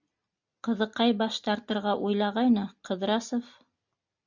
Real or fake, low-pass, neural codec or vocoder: real; 7.2 kHz; none